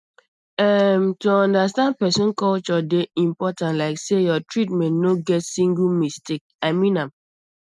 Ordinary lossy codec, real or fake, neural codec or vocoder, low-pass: none; real; none; none